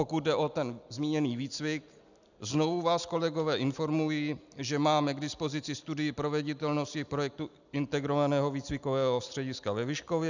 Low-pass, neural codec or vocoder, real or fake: 7.2 kHz; none; real